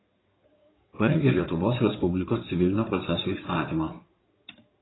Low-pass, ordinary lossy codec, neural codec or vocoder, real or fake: 7.2 kHz; AAC, 16 kbps; codec, 16 kHz in and 24 kHz out, 2.2 kbps, FireRedTTS-2 codec; fake